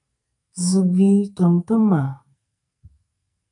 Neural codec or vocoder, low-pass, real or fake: codec, 44.1 kHz, 2.6 kbps, SNAC; 10.8 kHz; fake